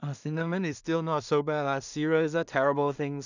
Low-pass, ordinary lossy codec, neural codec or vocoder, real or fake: 7.2 kHz; none; codec, 16 kHz in and 24 kHz out, 0.4 kbps, LongCat-Audio-Codec, two codebook decoder; fake